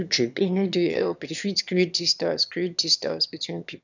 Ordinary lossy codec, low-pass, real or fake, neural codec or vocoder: none; 7.2 kHz; fake; autoencoder, 22.05 kHz, a latent of 192 numbers a frame, VITS, trained on one speaker